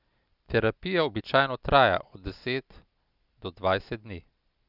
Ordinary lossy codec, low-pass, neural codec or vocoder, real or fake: Opus, 64 kbps; 5.4 kHz; none; real